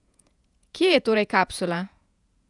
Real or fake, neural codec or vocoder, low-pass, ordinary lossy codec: fake; vocoder, 48 kHz, 128 mel bands, Vocos; 10.8 kHz; none